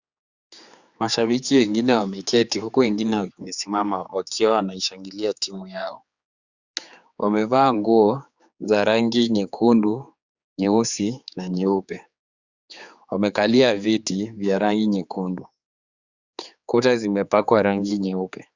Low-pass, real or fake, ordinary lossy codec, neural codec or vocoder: 7.2 kHz; fake; Opus, 64 kbps; codec, 16 kHz, 4 kbps, X-Codec, HuBERT features, trained on general audio